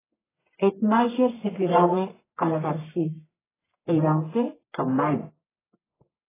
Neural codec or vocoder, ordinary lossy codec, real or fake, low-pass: codec, 44.1 kHz, 1.7 kbps, Pupu-Codec; AAC, 16 kbps; fake; 3.6 kHz